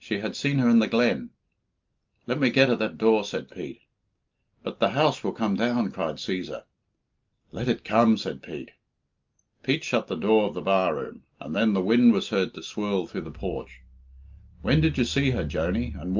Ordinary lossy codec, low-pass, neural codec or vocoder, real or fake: Opus, 32 kbps; 7.2 kHz; none; real